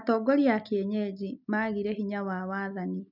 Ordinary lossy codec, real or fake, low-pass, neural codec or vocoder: none; real; 5.4 kHz; none